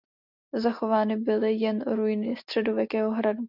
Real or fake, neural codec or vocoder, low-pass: real; none; 5.4 kHz